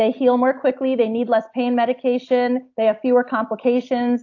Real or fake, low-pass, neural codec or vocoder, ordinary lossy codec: real; 7.2 kHz; none; AAC, 48 kbps